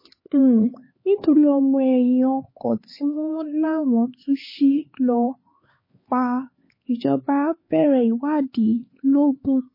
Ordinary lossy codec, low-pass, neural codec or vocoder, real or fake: MP3, 24 kbps; 5.4 kHz; codec, 16 kHz, 4 kbps, X-Codec, HuBERT features, trained on LibriSpeech; fake